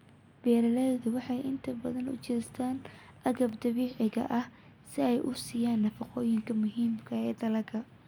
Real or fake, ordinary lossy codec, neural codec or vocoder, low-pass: real; none; none; none